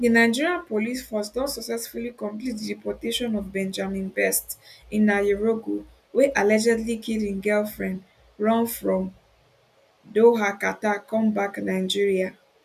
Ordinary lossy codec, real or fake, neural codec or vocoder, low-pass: none; real; none; 14.4 kHz